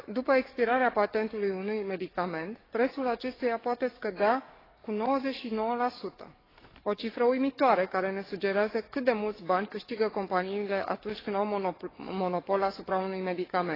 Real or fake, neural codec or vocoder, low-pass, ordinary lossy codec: fake; codec, 44.1 kHz, 7.8 kbps, DAC; 5.4 kHz; AAC, 24 kbps